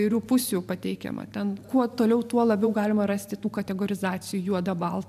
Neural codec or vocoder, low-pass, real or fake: vocoder, 44.1 kHz, 128 mel bands every 512 samples, BigVGAN v2; 14.4 kHz; fake